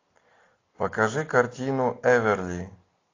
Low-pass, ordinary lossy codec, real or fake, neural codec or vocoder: 7.2 kHz; AAC, 32 kbps; real; none